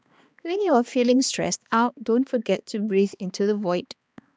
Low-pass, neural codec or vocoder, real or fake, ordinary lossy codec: none; codec, 16 kHz, 2 kbps, X-Codec, HuBERT features, trained on balanced general audio; fake; none